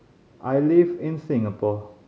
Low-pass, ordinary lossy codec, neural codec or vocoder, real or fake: none; none; none; real